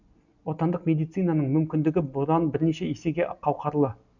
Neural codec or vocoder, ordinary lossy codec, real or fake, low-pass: none; none; real; 7.2 kHz